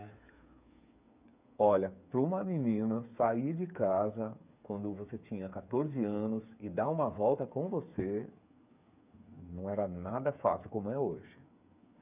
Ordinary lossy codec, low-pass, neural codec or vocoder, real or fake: none; 3.6 kHz; codec, 16 kHz, 8 kbps, FreqCodec, smaller model; fake